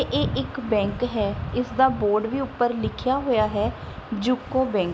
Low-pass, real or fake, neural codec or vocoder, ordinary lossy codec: none; real; none; none